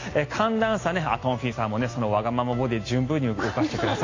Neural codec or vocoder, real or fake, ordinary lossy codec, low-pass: none; real; AAC, 32 kbps; 7.2 kHz